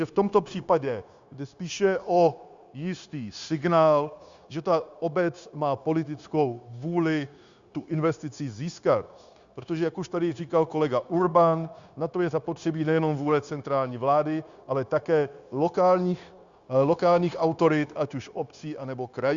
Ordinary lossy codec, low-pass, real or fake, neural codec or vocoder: Opus, 64 kbps; 7.2 kHz; fake; codec, 16 kHz, 0.9 kbps, LongCat-Audio-Codec